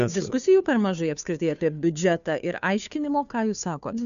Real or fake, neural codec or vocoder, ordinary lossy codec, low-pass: fake; codec, 16 kHz, 2 kbps, FunCodec, trained on Chinese and English, 25 frames a second; MP3, 96 kbps; 7.2 kHz